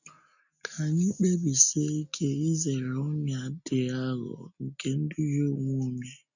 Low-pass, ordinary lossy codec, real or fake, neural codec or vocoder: 7.2 kHz; none; real; none